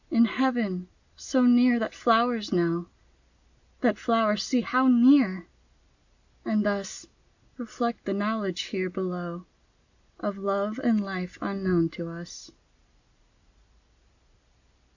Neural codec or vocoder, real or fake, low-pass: none; real; 7.2 kHz